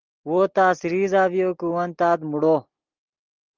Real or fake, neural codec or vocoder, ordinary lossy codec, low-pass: real; none; Opus, 32 kbps; 7.2 kHz